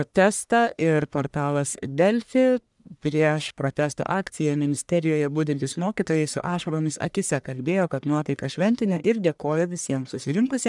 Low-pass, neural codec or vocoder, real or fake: 10.8 kHz; codec, 44.1 kHz, 1.7 kbps, Pupu-Codec; fake